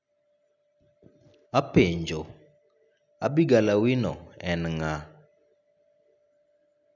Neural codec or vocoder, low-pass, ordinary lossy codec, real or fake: none; 7.2 kHz; none; real